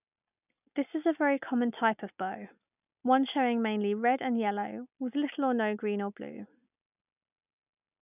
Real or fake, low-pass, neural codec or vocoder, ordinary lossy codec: real; 3.6 kHz; none; none